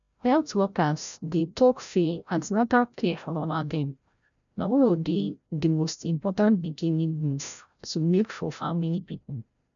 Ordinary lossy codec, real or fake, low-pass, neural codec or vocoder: none; fake; 7.2 kHz; codec, 16 kHz, 0.5 kbps, FreqCodec, larger model